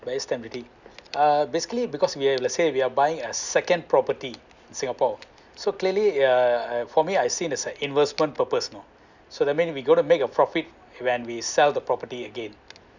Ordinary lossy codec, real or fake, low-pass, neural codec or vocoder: none; real; 7.2 kHz; none